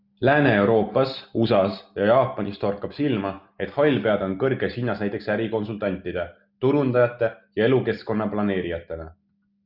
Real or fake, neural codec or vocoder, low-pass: real; none; 5.4 kHz